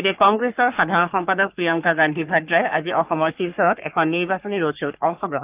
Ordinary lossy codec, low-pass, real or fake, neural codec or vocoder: Opus, 24 kbps; 3.6 kHz; fake; codec, 44.1 kHz, 3.4 kbps, Pupu-Codec